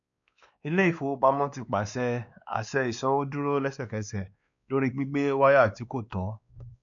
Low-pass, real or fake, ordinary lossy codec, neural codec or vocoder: 7.2 kHz; fake; none; codec, 16 kHz, 2 kbps, X-Codec, WavLM features, trained on Multilingual LibriSpeech